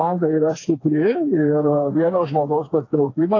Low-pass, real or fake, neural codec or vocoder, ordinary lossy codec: 7.2 kHz; fake; codec, 16 kHz, 4 kbps, FreqCodec, smaller model; AAC, 32 kbps